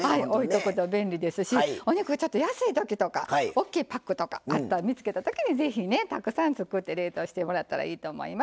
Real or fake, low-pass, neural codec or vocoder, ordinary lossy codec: real; none; none; none